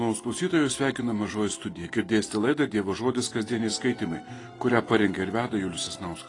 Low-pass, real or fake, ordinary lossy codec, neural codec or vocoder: 10.8 kHz; real; AAC, 32 kbps; none